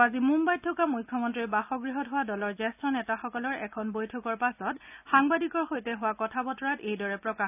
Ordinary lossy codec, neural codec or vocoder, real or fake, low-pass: none; none; real; 3.6 kHz